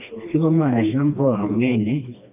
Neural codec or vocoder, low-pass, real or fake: codec, 16 kHz, 2 kbps, FreqCodec, smaller model; 3.6 kHz; fake